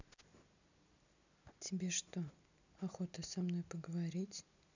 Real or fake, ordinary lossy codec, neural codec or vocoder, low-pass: real; none; none; 7.2 kHz